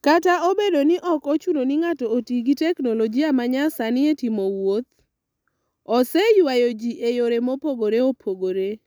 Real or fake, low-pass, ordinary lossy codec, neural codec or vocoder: real; none; none; none